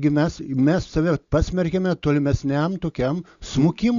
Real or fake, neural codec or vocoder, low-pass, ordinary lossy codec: real; none; 7.2 kHz; Opus, 64 kbps